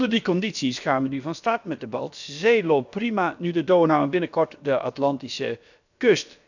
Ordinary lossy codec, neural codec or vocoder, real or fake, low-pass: none; codec, 16 kHz, about 1 kbps, DyCAST, with the encoder's durations; fake; 7.2 kHz